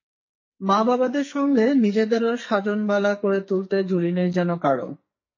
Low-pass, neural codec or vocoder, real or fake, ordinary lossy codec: 7.2 kHz; codec, 44.1 kHz, 2.6 kbps, SNAC; fake; MP3, 32 kbps